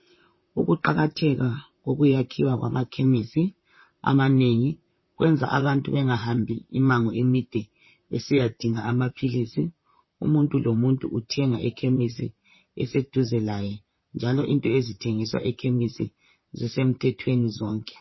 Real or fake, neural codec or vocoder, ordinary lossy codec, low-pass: fake; vocoder, 44.1 kHz, 128 mel bands, Pupu-Vocoder; MP3, 24 kbps; 7.2 kHz